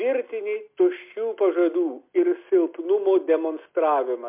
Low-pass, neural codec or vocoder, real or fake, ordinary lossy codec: 3.6 kHz; none; real; MP3, 32 kbps